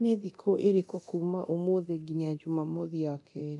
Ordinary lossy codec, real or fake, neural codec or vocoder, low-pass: none; fake; codec, 24 kHz, 0.9 kbps, DualCodec; 10.8 kHz